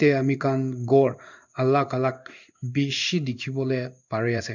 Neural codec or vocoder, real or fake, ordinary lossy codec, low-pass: codec, 16 kHz in and 24 kHz out, 1 kbps, XY-Tokenizer; fake; none; 7.2 kHz